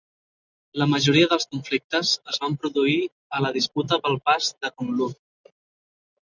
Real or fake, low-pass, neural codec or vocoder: real; 7.2 kHz; none